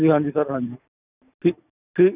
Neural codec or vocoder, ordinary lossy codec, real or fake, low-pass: none; none; real; 3.6 kHz